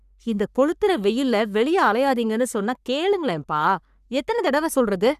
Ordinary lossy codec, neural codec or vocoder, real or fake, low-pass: none; codec, 44.1 kHz, 3.4 kbps, Pupu-Codec; fake; 14.4 kHz